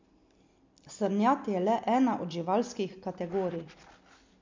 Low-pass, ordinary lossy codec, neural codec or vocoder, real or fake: 7.2 kHz; MP3, 48 kbps; none; real